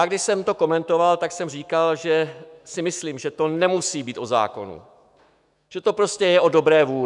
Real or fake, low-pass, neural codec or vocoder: fake; 10.8 kHz; autoencoder, 48 kHz, 128 numbers a frame, DAC-VAE, trained on Japanese speech